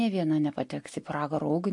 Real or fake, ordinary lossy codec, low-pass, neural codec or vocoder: real; MP3, 48 kbps; 10.8 kHz; none